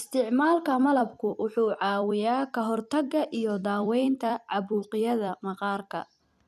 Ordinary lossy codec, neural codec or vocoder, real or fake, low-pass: none; vocoder, 44.1 kHz, 128 mel bands every 256 samples, BigVGAN v2; fake; 14.4 kHz